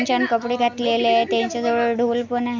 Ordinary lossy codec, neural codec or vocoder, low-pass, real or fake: MP3, 64 kbps; none; 7.2 kHz; real